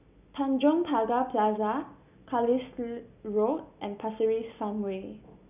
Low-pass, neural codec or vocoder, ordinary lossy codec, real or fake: 3.6 kHz; none; none; real